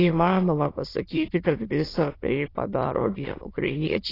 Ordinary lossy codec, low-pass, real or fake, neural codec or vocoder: AAC, 24 kbps; 5.4 kHz; fake; autoencoder, 22.05 kHz, a latent of 192 numbers a frame, VITS, trained on many speakers